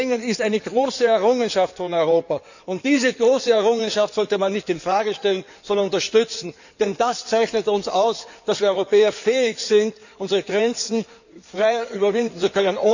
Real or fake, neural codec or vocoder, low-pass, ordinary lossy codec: fake; codec, 16 kHz in and 24 kHz out, 2.2 kbps, FireRedTTS-2 codec; 7.2 kHz; none